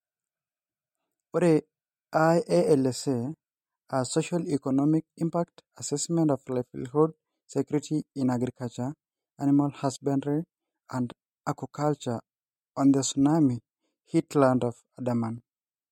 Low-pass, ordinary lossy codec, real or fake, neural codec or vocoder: 19.8 kHz; MP3, 64 kbps; real; none